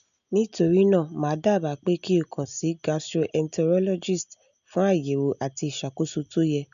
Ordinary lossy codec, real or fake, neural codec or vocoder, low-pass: none; real; none; 7.2 kHz